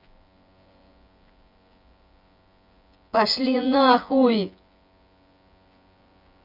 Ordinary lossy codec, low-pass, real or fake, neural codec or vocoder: none; 5.4 kHz; fake; vocoder, 24 kHz, 100 mel bands, Vocos